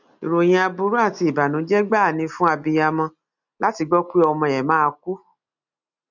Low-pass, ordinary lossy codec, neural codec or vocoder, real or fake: 7.2 kHz; none; none; real